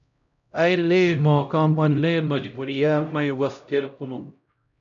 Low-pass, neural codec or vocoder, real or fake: 7.2 kHz; codec, 16 kHz, 0.5 kbps, X-Codec, HuBERT features, trained on LibriSpeech; fake